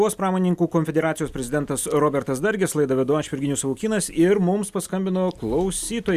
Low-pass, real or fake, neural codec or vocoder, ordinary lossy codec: 14.4 kHz; real; none; Opus, 64 kbps